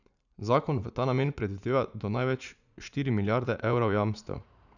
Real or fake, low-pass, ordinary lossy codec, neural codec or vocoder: fake; 7.2 kHz; none; vocoder, 44.1 kHz, 80 mel bands, Vocos